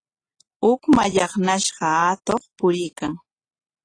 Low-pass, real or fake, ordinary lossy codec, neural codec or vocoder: 9.9 kHz; real; AAC, 32 kbps; none